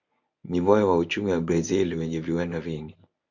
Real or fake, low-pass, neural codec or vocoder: fake; 7.2 kHz; codec, 24 kHz, 0.9 kbps, WavTokenizer, medium speech release version 1